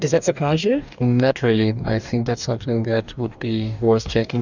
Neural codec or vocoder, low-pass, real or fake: codec, 44.1 kHz, 2.6 kbps, DAC; 7.2 kHz; fake